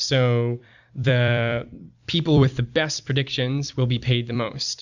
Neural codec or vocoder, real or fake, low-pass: vocoder, 44.1 kHz, 128 mel bands every 256 samples, BigVGAN v2; fake; 7.2 kHz